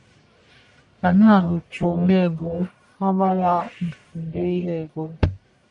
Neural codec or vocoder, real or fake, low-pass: codec, 44.1 kHz, 1.7 kbps, Pupu-Codec; fake; 10.8 kHz